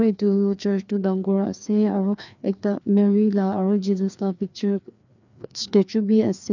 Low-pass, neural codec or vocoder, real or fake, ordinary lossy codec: 7.2 kHz; codec, 16 kHz, 2 kbps, FreqCodec, larger model; fake; none